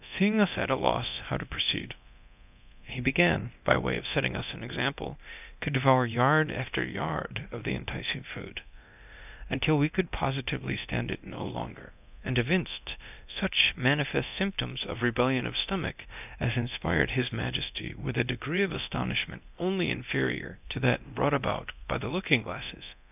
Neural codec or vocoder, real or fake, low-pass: codec, 24 kHz, 0.9 kbps, DualCodec; fake; 3.6 kHz